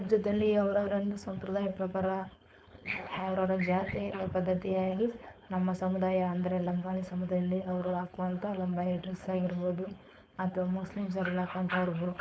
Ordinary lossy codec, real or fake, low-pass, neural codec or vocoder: none; fake; none; codec, 16 kHz, 4.8 kbps, FACodec